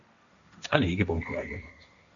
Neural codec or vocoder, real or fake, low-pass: codec, 16 kHz, 1.1 kbps, Voila-Tokenizer; fake; 7.2 kHz